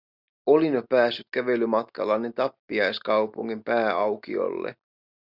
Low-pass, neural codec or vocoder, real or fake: 5.4 kHz; none; real